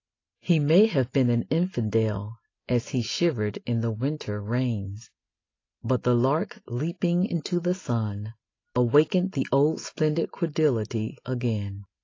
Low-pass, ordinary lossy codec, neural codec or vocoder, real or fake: 7.2 kHz; AAC, 32 kbps; none; real